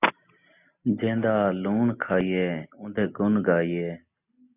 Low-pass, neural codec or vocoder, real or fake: 3.6 kHz; none; real